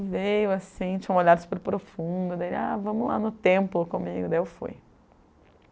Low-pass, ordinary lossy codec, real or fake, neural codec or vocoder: none; none; real; none